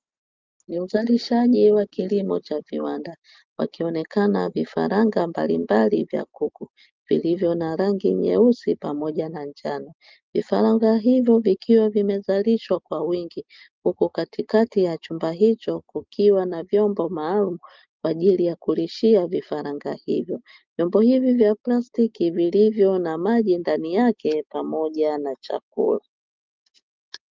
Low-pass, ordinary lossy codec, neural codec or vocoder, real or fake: 7.2 kHz; Opus, 24 kbps; none; real